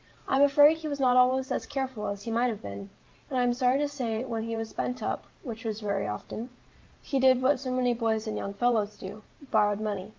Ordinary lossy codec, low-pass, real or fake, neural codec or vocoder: Opus, 32 kbps; 7.2 kHz; fake; vocoder, 44.1 kHz, 128 mel bands every 512 samples, BigVGAN v2